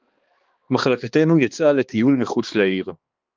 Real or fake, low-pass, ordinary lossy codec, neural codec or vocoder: fake; 7.2 kHz; Opus, 24 kbps; codec, 16 kHz, 2 kbps, X-Codec, HuBERT features, trained on balanced general audio